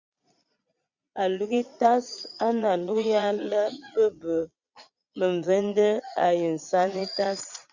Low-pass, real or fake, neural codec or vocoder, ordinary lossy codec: 7.2 kHz; fake; vocoder, 22.05 kHz, 80 mel bands, Vocos; Opus, 64 kbps